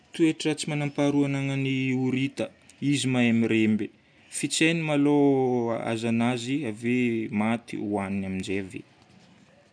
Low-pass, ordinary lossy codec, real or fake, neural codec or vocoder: 9.9 kHz; none; real; none